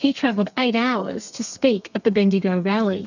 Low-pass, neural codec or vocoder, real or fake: 7.2 kHz; codec, 32 kHz, 1.9 kbps, SNAC; fake